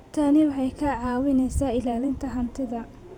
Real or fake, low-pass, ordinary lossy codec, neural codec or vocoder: fake; 19.8 kHz; none; vocoder, 44.1 kHz, 128 mel bands every 512 samples, BigVGAN v2